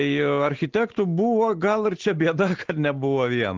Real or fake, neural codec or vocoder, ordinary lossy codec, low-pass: real; none; Opus, 16 kbps; 7.2 kHz